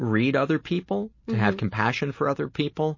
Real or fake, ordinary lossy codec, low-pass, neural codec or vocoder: real; MP3, 32 kbps; 7.2 kHz; none